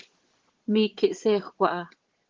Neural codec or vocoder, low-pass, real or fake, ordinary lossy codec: vocoder, 22.05 kHz, 80 mel bands, Vocos; 7.2 kHz; fake; Opus, 16 kbps